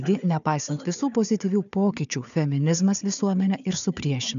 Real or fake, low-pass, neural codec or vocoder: fake; 7.2 kHz; codec, 16 kHz, 4 kbps, FunCodec, trained on Chinese and English, 50 frames a second